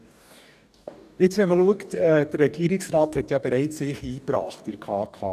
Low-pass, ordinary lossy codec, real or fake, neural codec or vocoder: 14.4 kHz; none; fake; codec, 44.1 kHz, 2.6 kbps, DAC